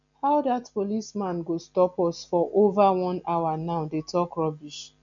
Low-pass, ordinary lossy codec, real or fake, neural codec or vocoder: 7.2 kHz; none; real; none